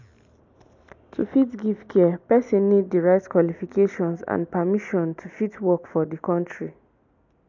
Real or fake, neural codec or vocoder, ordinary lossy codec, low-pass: real; none; MP3, 64 kbps; 7.2 kHz